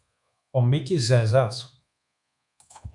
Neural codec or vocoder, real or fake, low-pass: codec, 24 kHz, 1.2 kbps, DualCodec; fake; 10.8 kHz